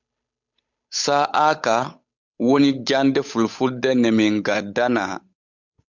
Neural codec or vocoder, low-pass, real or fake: codec, 16 kHz, 8 kbps, FunCodec, trained on Chinese and English, 25 frames a second; 7.2 kHz; fake